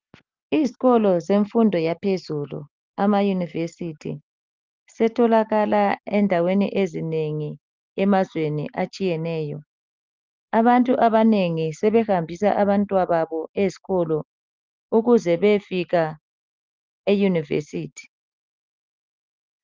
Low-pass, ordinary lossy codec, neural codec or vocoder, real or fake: 7.2 kHz; Opus, 24 kbps; none; real